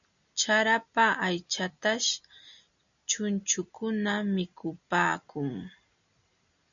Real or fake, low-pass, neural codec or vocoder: real; 7.2 kHz; none